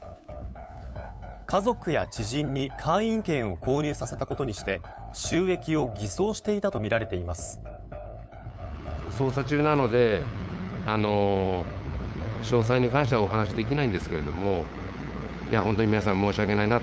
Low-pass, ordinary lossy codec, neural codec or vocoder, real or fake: none; none; codec, 16 kHz, 8 kbps, FunCodec, trained on LibriTTS, 25 frames a second; fake